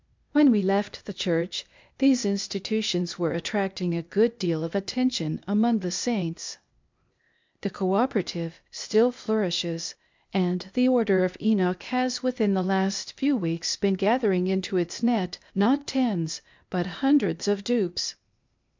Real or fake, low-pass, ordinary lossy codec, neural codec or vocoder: fake; 7.2 kHz; MP3, 64 kbps; codec, 16 kHz, 0.8 kbps, ZipCodec